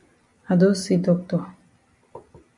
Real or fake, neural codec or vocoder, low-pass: real; none; 10.8 kHz